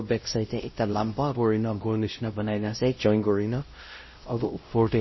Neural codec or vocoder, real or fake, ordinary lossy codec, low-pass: codec, 16 kHz, 0.5 kbps, X-Codec, WavLM features, trained on Multilingual LibriSpeech; fake; MP3, 24 kbps; 7.2 kHz